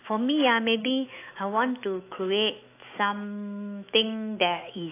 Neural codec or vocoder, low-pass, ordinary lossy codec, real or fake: autoencoder, 48 kHz, 128 numbers a frame, DAC-VAE, trained on Japanese speech; 3.6 kHz; AAC, 24 kbps; fake